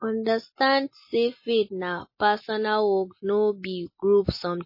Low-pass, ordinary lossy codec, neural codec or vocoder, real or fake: 5.4 kHz; MP3, 24 kbps; none; real